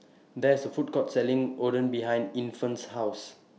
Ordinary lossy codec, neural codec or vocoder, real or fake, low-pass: none; none; real; none